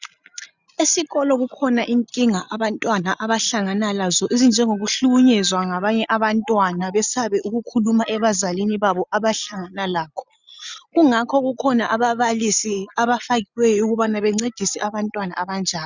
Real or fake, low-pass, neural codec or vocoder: real; 7.2 kHz; none